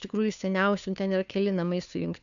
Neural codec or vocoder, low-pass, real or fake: codec, 16 kHz, 2 kbps, FunCodec, trained on Chinese and English, 25 frames a second; 7.2 kHz; fake